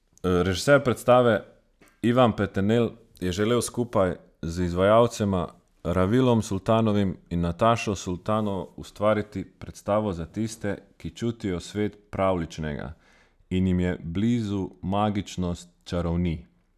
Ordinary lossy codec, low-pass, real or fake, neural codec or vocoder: none; 14.4 kHz; real; none